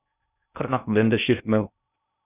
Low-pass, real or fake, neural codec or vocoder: 3.6 kHz; fake; codec, 16 kHz in and 24 kHz out, 0.6 kbps, FocalCodec, streaming, 2048 codes